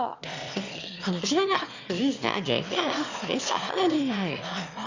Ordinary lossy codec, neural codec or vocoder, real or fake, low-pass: Opus, 64 kbps; autoencoder, 22.05 kHz, a latent of 192 numbers a frame, VITS, trained on one speaker; fake; 7.2 kHz